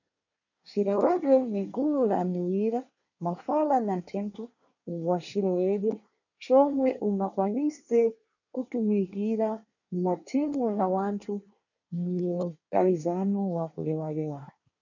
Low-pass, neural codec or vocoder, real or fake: 7.2 kHz; codec, 24 kHz, 1 kbps, SNAC; fake